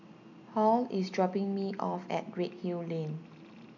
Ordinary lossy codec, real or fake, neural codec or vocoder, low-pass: none; real; none; 7.2 kHz